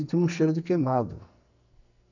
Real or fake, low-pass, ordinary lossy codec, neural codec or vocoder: fake; 7.2 kHz; none; codec, 44.1 kHz, 2.6 kbps, SNAC